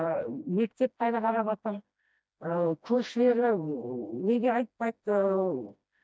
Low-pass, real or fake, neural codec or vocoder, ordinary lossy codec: none; fake; codec, 16 kHz, 1 kbps, FreqCodec, smaller model; none